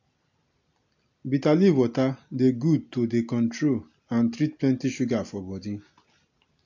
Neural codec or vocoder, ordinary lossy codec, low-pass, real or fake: none; MP3, 48 kbps; 7.2 kHz; real